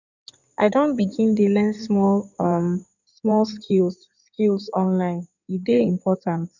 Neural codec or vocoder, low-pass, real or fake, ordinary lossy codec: codec, 16 kHz in and 24 kHz out, 2.2 kbps, FireRedTTS-2 codec; 7.2 kHz; fake; none